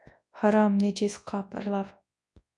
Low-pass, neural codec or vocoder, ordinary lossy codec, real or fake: 10.8 kHz; codec, 24 kHz, 0.9 kbps, WavTokenizer, large speech release; MP3, 64 kbps; fake